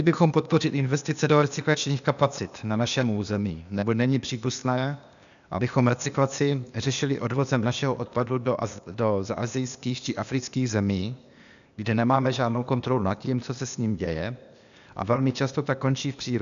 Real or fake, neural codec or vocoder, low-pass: fake; codec, 16 kHz, 0.8 kbps, ZipCodec; 7.2 kHz